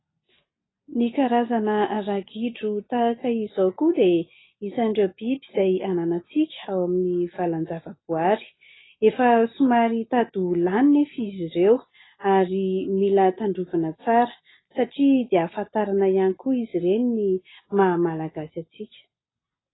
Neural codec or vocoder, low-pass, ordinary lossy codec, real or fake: none; 7.2 kHz; AAC, 16 kbps; real